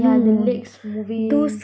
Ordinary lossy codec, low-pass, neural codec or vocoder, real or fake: none; none; none; real